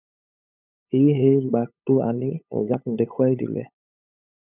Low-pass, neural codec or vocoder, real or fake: 3.6 kHz; codec, 16 kHz, 8 kbps, FunCodec, trained on LibriTTS, 25 frames a second; fake